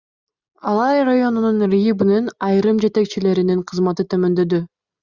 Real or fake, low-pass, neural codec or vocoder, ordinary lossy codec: real; 7.2 kHz; none; Opus, 64 kbps